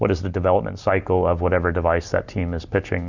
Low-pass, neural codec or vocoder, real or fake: 7.2 kHz; none; real